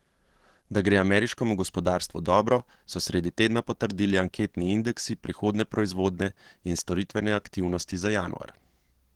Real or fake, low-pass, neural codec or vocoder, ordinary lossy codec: fake; 19.8 kHz; codec, 44.1 kHz, 7.8 kbps, DAC; Opus, 16 kbps